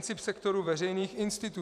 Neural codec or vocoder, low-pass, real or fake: vocoder, 44.1 kHz, 128 mel bands every 512 samples, BigVGAN v2; 14.4 kHz; fake